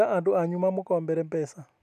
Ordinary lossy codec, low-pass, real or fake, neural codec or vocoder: none; 14.4 kHz; real; none